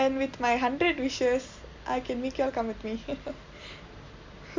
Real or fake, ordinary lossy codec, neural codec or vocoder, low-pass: real; MP3, 64 kbps; none; 7.2 kHz